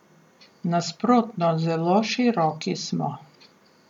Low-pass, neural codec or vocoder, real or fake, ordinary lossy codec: 19.8 kHz; none; real; none